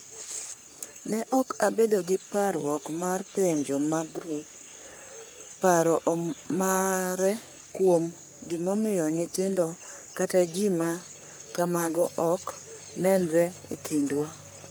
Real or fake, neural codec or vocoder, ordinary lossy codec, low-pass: fake; codec, 44.1 kHz, 3.4 kbps, Pupu-Codec; none; none